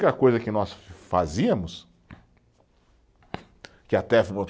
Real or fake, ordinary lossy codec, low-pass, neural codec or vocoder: real; none; none; none